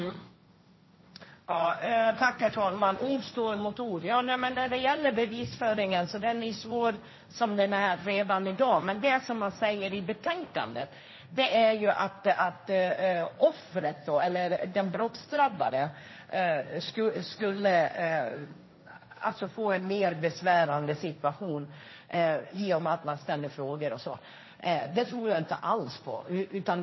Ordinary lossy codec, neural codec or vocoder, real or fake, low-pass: MP3, 24 kbps; codec, 16 kHz, 1.1 kbps, Voila-Tokenizer; fake; 7.2 kHz